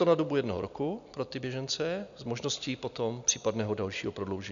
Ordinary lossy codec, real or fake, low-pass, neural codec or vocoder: MP3, 96 kbps; real; 7.2 kHz; none